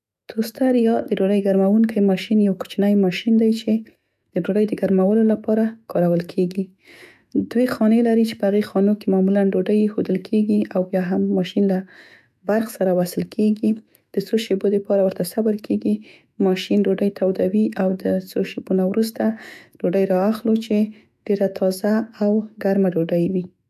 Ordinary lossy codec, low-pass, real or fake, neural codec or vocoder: AAC, 96 kbps; 14.4 kHz; fake; autoencoder, 48 kHz, 128 numbers a frame, DAC-VAE, trained on Japanese speech